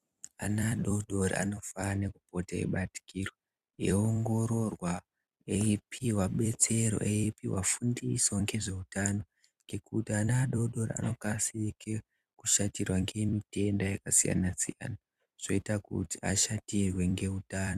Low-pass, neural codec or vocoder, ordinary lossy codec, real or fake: 14.4 kHz; vocoder, 44.1 kHz, 128 mel bands every 256 samples, BigVGAN v2; Opus, 64 kbps; fake